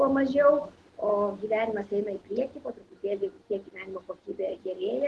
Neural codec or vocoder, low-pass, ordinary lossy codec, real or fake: none; 10.8 kHz; Opus, 16 kbps; real